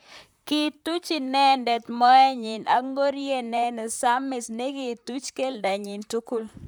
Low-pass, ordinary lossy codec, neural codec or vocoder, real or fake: none; none; vocoder, 44.1 kHz, 128 mel bands, Pupu-Vocoder; fake